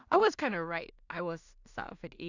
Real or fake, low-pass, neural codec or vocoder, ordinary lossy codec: fake; 7.2 kHz; codec, 16 kHz in and 24 kHz out, 0.4 kbps, LongCat-Audio-Codec, two codebook decoder; none